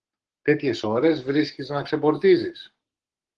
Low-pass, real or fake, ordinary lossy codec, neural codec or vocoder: 10.8 kHz; fake; Opus, 16 kbps; codec, 44.1 kHz, 7.8 kbps, Pupu-Codec